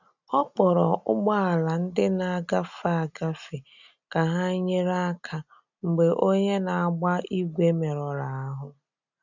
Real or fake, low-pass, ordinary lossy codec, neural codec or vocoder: real; 7.2 kHz; none; none